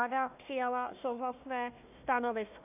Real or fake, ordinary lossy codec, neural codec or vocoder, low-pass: fake; AAC, 32 kbps; codec, 16 kHz, 1 kbps, FunCodec, trained on Chinese and English, 50 frames a second; 3.6 kHz